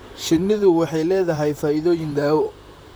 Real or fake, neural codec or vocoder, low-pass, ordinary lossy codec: fake; vocoder, 44.1 kHz, 128 mel bands, Pupu-Vocoder; none; none